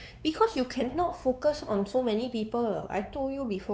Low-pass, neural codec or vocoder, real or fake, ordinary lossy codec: none; codec, 16 kHz, 4 kbps, X-Codec, WavLM features, trained on Multilingual LibriSpeech; fake; none